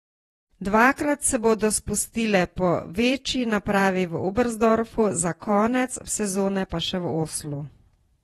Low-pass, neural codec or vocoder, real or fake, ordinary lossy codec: 19.8 kHz; vocoder, 48 kHz, 128 mel bands, Vocos; fake; AAC, 32 kbps